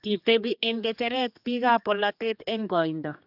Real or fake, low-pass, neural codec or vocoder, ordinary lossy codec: fake; 5.4 kHz; codec, 16 kHz, 2 kbps, X-Codec, HuBERT features, trained on general audio; AAC, 48 kbps